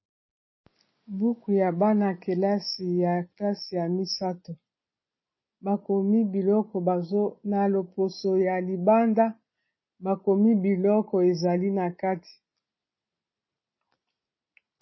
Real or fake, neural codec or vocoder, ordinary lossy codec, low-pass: real; none; MP3, 24 kbps; 7.2 kHz